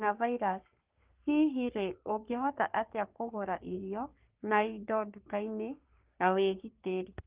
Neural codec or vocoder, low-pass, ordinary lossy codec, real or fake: codec, 44.1 kHz, 3.4 kbps, Pupu-Codec; 3.6 kHz; Opus, 32 kbps; fake